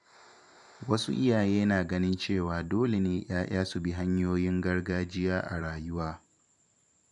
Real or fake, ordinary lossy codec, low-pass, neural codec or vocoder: fake; none; 10.8 kHz; vocoder, 44.1 kHz, 128 mel bands every 512 samples, BigVGAN v2